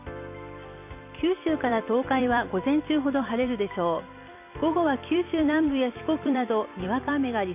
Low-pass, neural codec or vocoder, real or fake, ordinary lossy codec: 3.6 kHz; vocoder, 44.1 kHz, 128 mel bands every 256 samples, BigVGAN v2; fake; AAC, 32 kbps